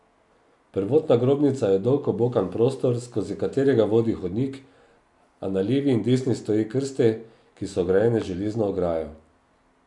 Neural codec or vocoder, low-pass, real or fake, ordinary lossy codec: none; 10.8 kHz; real; none